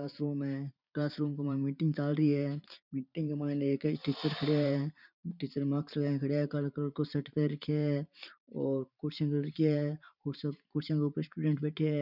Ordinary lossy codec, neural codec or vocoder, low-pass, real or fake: none; vocoder, 44.1 kHz, 128 mel bands every 512 samples, BigVGAN v2; 5.4 kHz; fake